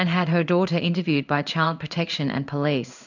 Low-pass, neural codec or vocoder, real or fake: 7.2 kHz; codec, 16 kHz in and 24 kHz out, 1 kbps, XY-Tokenizer; fake